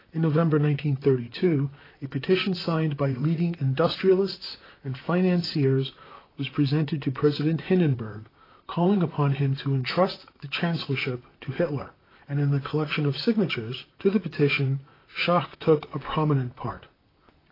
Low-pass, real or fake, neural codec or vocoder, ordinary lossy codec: 5.4 kHz; fake; vocoder, 44.1 kHz, 128 mel bands, Pupu-Vocoder; AAC, 24 kbps